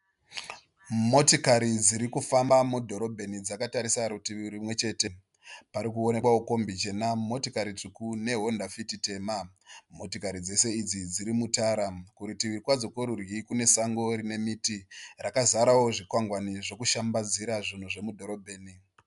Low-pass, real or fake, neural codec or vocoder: 10.8 kHz; real; none